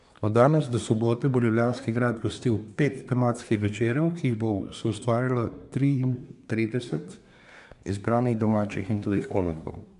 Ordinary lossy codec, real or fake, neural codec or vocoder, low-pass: none; fake; codec, 24 kHz, 1 kbps, SNAC; 10.8 kHz